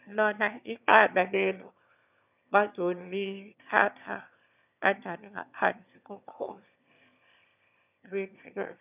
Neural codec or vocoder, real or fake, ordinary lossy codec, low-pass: autoencoder, 22.05 kHz, a latent of 192 numbers a frame, VITS, trained on one speaker; fake; none; 3.6 kHz